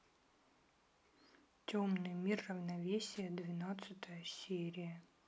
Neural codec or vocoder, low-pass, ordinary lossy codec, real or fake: none; none; none; real